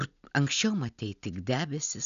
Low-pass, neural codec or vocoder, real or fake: 7.2 kHz; none; real